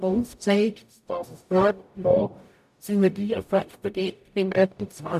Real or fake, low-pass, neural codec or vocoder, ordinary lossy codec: fake; 14.4 kHz; codec, 44.1 kHz, 0.9 kbps, DAC; none